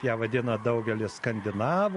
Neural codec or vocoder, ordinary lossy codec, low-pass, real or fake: none; MP3, 48 kbps; 10.8 kHz; real